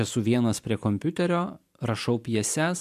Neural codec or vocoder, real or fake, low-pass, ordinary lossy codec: vocoder, 44.1 kHz, 128 mel bands every 256 samples, BigVGAN v2; fake; 14.4 kHz; MP3, 96 kbps